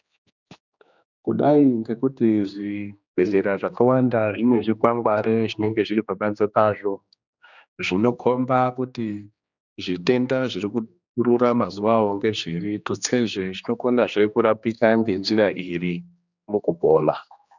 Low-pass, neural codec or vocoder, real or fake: 7.2 kHz; codec, 16 kHz, 2 kbps, X-Codec, HuBERT features, trained on general audio; fake